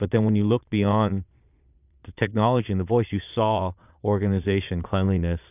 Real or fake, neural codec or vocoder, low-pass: fake; vocoder, 22.05 kHz, 80 mel bands, Vocos; 3.6 kHz